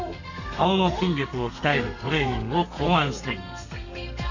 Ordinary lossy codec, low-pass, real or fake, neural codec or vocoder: none; 7.2 kHz; fake; codec, 32 kHz, 1.9 kbps, SNAC